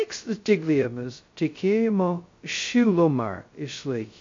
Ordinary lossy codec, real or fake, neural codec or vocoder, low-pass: MP3, 48 kbps; fake; codec, 16 kHz, 0.2 kbps, FocalCodec; 7.2 kHz